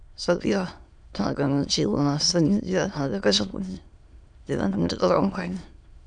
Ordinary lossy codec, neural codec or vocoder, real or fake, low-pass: none; autoencoder, 22.05 kHz, a latent of 192 numbers a frame, VITS, trained on many speakers; fake; 9.9 kHz